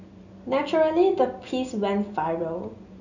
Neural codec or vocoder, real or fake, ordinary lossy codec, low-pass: none; real; none; 7.2 kHz